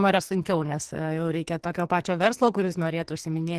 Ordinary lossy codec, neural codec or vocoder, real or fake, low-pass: Opus, 16 kbps; codec, 44.1 kHz, 2.6 kbps, SNAC; fake; 14.4 kHz